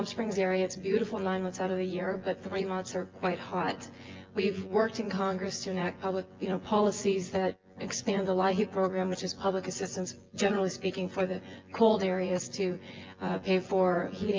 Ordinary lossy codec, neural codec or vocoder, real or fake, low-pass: Opus, 32 kbps; vocoder, 24 kHz, 100 mel bands, Vocos; fake; 7.2 kHz